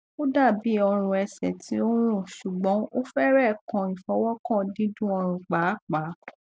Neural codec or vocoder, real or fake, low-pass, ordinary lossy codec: none; real; none; none